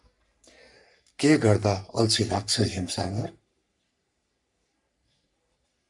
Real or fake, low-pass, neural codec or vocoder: fake; 10.8 kHz; codec, 44.1 kHz, 3.4 kbps, Pupu-Codec